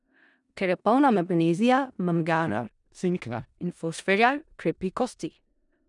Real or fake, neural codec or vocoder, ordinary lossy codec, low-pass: fake; codec, 16 kHz in and 24 kHz out, 0.4 kbps, LongCat-Audio-Codec, four codebook decoder; none; 10.8 kHz